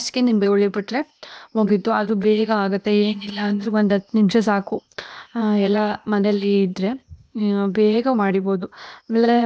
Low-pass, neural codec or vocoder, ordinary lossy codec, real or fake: none; codec, 16 kHz, 0.8 kbps, ZipCodec; none; fake